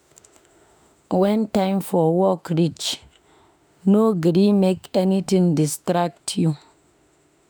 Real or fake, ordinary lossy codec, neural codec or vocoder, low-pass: fake; none; autoencoder, 48 kHz, 32 numbers a frame, DAC-VAE, trained on Japanese speech; none